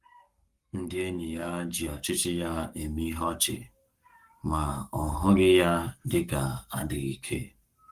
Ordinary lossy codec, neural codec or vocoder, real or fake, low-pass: Opus, 32 kbps; codec, 44.1 kHz, 7.8 kbps, Pupu-Codec; fake; 14.4 kHz